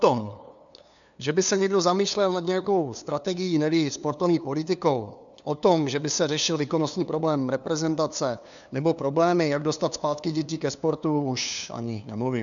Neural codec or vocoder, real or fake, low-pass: codec, 16 kHz, 2 kbps, FunCodec, trained on LibriTTS, 25 frames a second; fake; 7.2 kHz